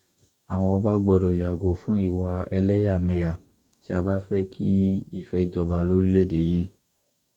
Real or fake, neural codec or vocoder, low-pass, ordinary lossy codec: fake; codec, 44.1 kHz, 2.6 kbps, DAC; 19.8 kHz; none